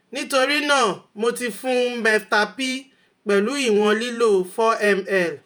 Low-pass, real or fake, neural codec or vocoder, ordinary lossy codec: none; fake; vocoder, 48 kHz, 128 mel bands, Vocos; none